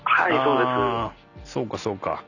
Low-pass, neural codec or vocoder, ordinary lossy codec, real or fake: 7.2 kHz; none; none; real